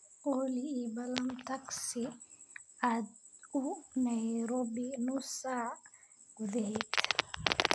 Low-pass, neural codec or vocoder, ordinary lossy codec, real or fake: none; none; none; real